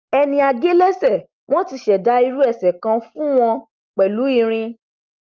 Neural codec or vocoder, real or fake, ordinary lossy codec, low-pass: none; real; Opus, 32 kbps; 7.2 kHz